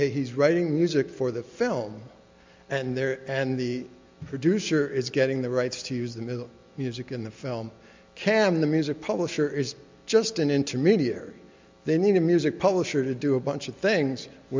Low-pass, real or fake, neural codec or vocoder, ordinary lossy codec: 7.2 kHz; real; none; MP3, 64 kbps